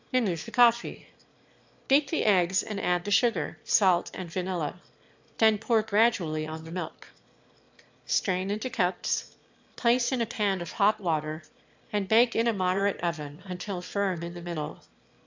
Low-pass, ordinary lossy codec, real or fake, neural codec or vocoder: 7.2 kHz; MP3, 64 kbps; fake; autoencoder, 22.05 kHz, a latent of 192 numbers a frame, VITS, trained on one speaker